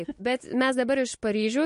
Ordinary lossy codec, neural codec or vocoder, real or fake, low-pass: MP3, 48 kbps; none; real; 14.4 kHz